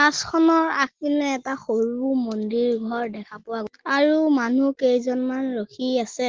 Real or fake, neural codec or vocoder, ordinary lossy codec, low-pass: real; none; Opus, 16 kbps; 7.2 kHz